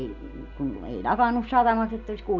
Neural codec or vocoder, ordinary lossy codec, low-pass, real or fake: none; none; 7.2 kHz; real